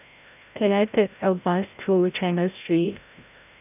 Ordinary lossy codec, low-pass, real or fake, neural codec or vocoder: none; 3.6 kHz; fake; codec, 16 kHz, 0.5 kbps, FreqCodec, larger model